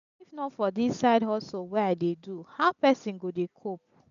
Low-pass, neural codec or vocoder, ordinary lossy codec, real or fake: 7.2 kHz; none; none; real